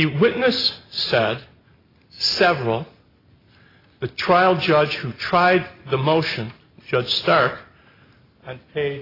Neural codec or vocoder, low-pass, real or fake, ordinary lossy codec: none; 5.4 kHz; real; AAC, 24 kbps